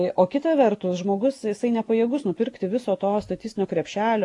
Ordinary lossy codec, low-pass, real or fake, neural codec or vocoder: AAC, 48 kbps; 10.8 kHz; real; none